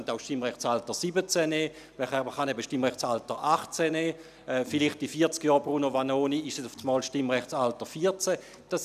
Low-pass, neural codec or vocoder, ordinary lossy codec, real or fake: 14.4 kHz; none; none; real